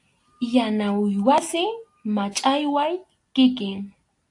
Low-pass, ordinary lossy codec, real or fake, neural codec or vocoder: 10.8 kHz; AAC, 64 kbps; real; none